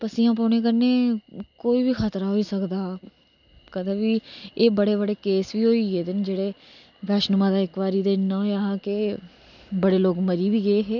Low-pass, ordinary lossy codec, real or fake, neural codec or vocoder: 7.2 kHz; none; real; none